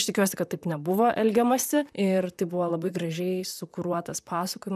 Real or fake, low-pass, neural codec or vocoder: fake; 14.4 kHz; vocoder, 44.1 kHz, 128 mel bands, Pupu-Vocoder